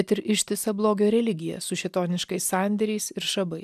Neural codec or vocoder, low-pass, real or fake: none; 14.4 kHz; real